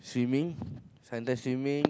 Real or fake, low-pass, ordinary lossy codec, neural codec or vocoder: real; none; none; none